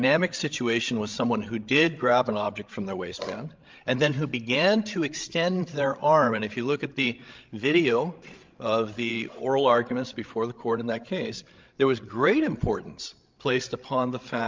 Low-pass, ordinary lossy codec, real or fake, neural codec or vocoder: 7.2 kHz; Opus, 24 kbps; fake; codec, 16 kHz, 16 kbps, FreqCodec, larger model